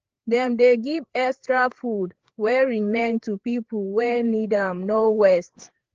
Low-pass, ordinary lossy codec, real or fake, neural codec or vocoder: 7.2 kHz; Opus, 16 kbps; fake; codec, 16 kHz, 4 kbps, FreqCodec, larger model